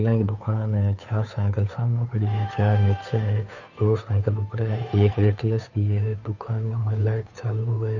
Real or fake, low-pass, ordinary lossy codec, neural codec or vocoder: fake; 7.2 kHz; none; codec, 16 kHz, 2 kbps, FunCodec, trained on Chinese and English, 25 frames a second